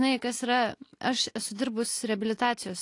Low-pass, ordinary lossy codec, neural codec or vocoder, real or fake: 10.8 kHz; AAC, 48 kbps; none; real